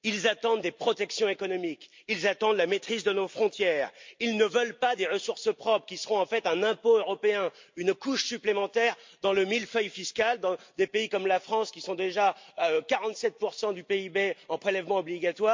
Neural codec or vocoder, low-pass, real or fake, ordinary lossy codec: none; 7.2 kHz; real; MP3, 48 kbps